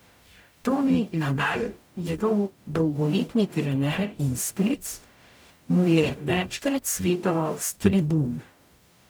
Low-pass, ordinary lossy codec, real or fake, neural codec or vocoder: none; none; fake; codec, 44.1 kHz, 0.9 kbps, DAC